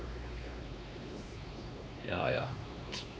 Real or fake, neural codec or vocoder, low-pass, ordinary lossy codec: fake; codec, 16 kHz, 2 kbps, X-Codec, WavLM features, trained on Multilingual LibriSpeech; none; none